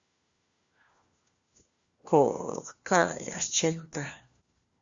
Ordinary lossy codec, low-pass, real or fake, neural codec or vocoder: Opus, 64 kbps; 7.2 kHz; fake; codec, 16 kHz, 1 kbps, FunCodec, trained on LibriTTS, 50 frames a second